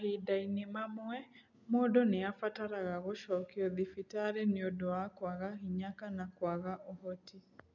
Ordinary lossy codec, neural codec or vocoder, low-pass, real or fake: none; none; 7.2 kHz; real